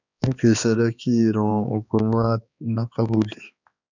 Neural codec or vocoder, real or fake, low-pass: codec, 16 kHz, 2 kbps, X-Codec, HuBERT features, trained on balanced general audio; fake; 7.2 kHz